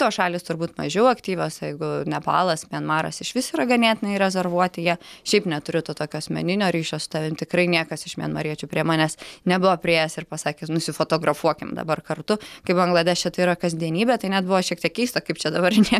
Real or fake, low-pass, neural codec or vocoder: real; 14.4 kHz; none